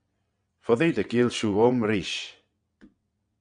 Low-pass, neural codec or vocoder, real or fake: 9.9 kHz; vocoder, 22.05 kHz, 80 mel bands, WaveNeXt; fake